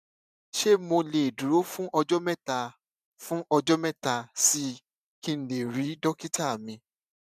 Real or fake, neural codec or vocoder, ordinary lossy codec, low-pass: fake; vocoder, 44.1 kHz, 128 mel bands every 512 samples, BigVGAN v2; none; 14.4 kHz